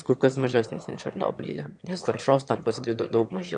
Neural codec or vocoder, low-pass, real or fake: autoencoder, 22.05 kHz, a latent of 192 numbers a frame, VITS, trained on one speaker; 9.9 kHz; fake